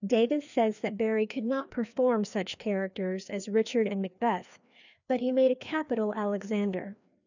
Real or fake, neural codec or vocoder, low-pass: fake; codec, 16 kHz, 2 kbps, FreqCodec, larger model; 7.2 kHz